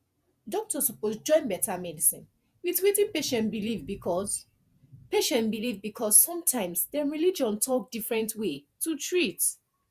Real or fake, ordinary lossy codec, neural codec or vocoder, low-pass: fake; Opus, 64 kbps; vocoder, 48 kHz, 128 mel bands, Vocos; 14.4 kHz